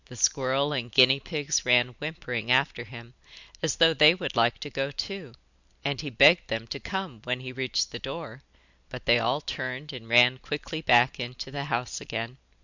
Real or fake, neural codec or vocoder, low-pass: real; none; 7.2 kHz